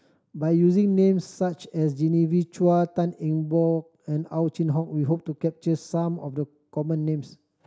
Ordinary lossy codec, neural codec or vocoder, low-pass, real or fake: none; none; none; real